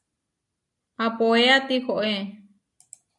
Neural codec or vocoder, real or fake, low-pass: none; real; 10.8 kHz